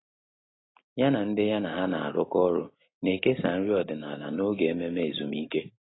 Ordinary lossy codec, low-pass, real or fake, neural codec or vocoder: AAC, 16 kbps; 7.2 kHz; real; none